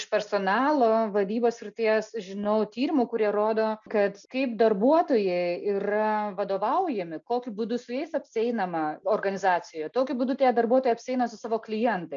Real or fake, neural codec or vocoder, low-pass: real; none; 7.2 kHz